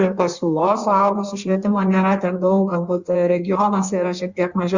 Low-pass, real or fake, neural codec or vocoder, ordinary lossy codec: 7.2 kHz; fake; codec, 16 kHz in and 24 kHz out, 1.1 kbps, FireRedTTS-2 codec; Opus, 64 kbps